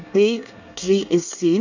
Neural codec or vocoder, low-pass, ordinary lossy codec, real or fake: codec, 24 kHz, 1 kbps, SNAC; 7.2 kHz; none; fake